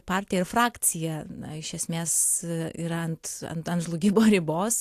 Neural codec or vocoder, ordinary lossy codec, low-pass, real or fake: none; AAC, 64 kbps; 14.4 kHz; real